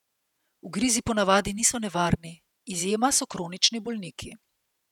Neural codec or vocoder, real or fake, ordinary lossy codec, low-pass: vocoder, 48 kHz, 128 mel bands, Vocos; fake; none; 19.8 kHz